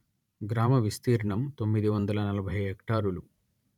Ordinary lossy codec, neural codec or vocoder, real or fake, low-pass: none; vocoder, 44.1 kHz, 128 mel bands every 512 samples, BigVGAN v2; fake; 19.8 kHz